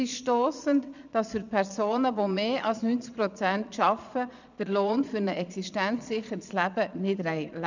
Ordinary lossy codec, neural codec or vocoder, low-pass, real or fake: none; vocoder, 22.05 kHz, 80 mel bands, Vocos; 7.2 kHz; fake